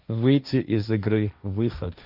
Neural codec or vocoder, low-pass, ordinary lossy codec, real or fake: codec, 16 kHz, 1.1 kbps, Voila-Tokenizer; 5.4 kHz; none; fake